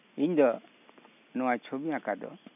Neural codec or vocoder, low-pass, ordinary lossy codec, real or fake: none; 3.6 kHz; none; real